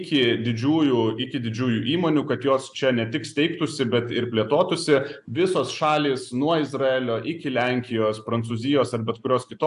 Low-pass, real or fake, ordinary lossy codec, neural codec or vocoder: 10.8 kHz; real; AAC, 96 kbps; none